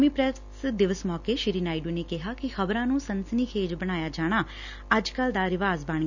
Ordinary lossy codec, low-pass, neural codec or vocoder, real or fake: none; 7.2 kHz; none; real